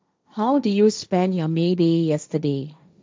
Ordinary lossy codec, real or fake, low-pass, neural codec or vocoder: none; fake; none; codec, 16 kHz, 1.1 kbps, Voila-Tokenizer